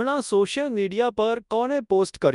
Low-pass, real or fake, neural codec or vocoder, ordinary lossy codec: 10.8 kHz; fake; codec, 24 kHz, 0.9 kbps, WavTokenizer, large speech release; none